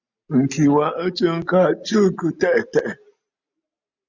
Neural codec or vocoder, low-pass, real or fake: none; 7.2 kHz; real